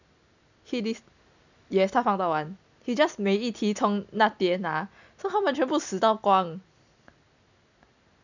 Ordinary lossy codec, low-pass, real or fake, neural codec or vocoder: none; 7.2 kHz; real; none